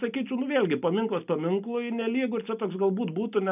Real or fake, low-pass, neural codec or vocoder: real; 3.6 kHz; none